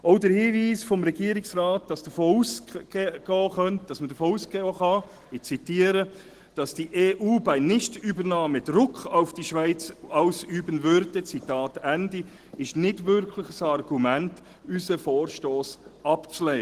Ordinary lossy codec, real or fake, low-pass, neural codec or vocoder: Opus, 16 kbps; real; 14.4 kHz; none